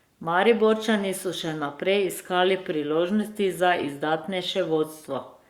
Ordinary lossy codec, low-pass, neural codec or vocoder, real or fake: Opus, 64 kbps; 19.8 kHz; codec, 44.1 kHz, 7.8 kbps, Pupu-Codec; fake